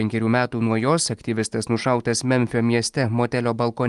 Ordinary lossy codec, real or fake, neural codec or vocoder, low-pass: Opus, 32 kbps; real; none; 10.8 kHz